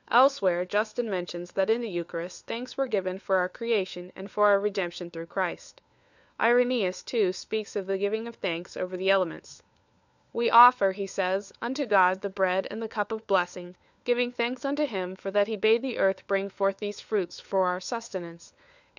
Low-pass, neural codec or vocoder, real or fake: 7.2 kHz; codec, 16 kHz, 4 kbps, FunCodec, trained on LibriTTS, 50 frames a second; fake